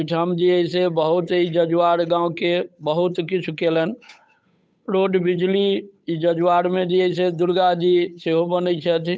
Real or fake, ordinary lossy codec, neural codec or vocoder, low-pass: fake; none; codec, 16 kHz, 8 kbps, FunCodec, trained on Chinese and English, 25 frames a second; none